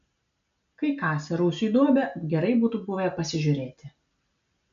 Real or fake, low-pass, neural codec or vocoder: real; 7.2 kHz; none